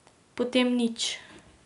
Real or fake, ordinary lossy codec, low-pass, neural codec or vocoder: real; none; 10.8 kHz; none